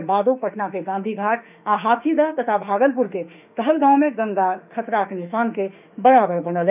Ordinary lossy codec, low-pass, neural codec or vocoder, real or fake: none; 3.6 kHz; autoencoder, 48 kHz, 32 numbers a frame, DAC-VAE, trained on Japanese speech; fake